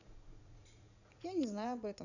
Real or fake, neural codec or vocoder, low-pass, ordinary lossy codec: fake; vocoder, 44.1 kHz, 80 mel bands, Vocos; 7.2 kHz; none